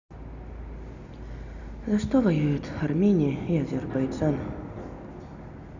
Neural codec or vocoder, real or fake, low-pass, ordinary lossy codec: none; real; 7.2 kHz; none